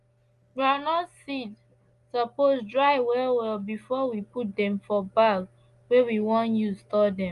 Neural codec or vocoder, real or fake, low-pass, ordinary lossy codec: none; real; 10.8 kHz; Opus, 32 kbps